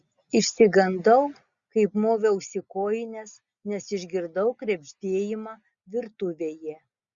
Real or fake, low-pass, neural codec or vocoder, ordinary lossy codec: real; 7.2 kHz; none; Opus, 64 kbps